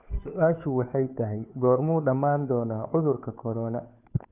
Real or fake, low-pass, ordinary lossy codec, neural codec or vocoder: fake; 3.6 kHz; none; codec, 16 kHz, 2 kbps, FunCodec, trained on Chinese and English, 25 frames a second